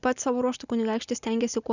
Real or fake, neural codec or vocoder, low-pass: real; none; 7.2 kHz